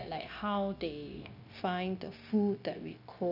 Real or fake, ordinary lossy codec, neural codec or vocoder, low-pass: fake; none; codec, 16 kHz, 0.9 kbps, LongCat-Audio-Codec; 5.4 kHz